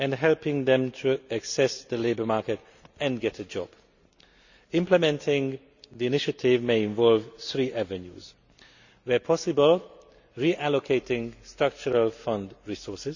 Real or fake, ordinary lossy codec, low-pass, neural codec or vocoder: real; none; 7.2 kHz; none